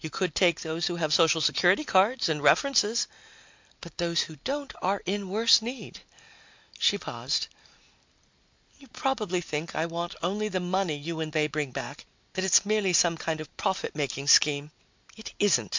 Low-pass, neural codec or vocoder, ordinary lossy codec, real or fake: 7.2 kHz; none; MP3, 64 kbps; real